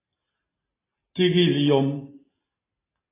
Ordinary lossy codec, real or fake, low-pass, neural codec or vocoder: AAC, 16 kbps; real; 3.6 kHz; none